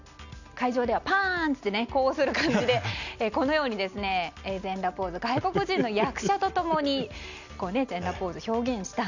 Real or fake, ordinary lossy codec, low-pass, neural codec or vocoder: real; none; 7.2 kHz; none